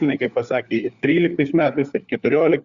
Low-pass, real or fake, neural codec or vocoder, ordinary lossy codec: 7.2 kHz; fake; codec, 16 kHz, 4 kbps, FunCodec, trained on LibriTTS, 50 frames a second; Opus, 64 kbps